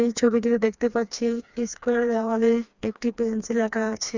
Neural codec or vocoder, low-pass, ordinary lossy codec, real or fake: codec, 16 kHz, 2 kbps, FreqCodec, smaller model; 7.2 kHz; Opus, 64 kbps; fake